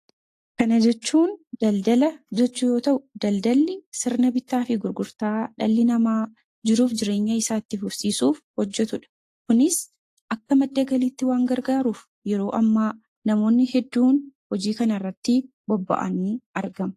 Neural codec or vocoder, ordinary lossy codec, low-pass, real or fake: none; AAC, 48 kbps; 14.4 kHz; real